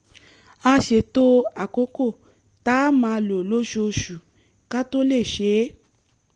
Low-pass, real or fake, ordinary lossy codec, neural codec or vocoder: 10.8 kHz; real; Opus, 24 kbps; none